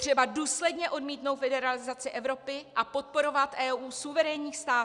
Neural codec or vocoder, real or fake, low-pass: none; real; 10.8 kHz